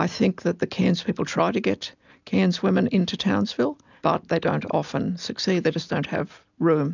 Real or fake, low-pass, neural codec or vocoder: real; 7.2 kHz; none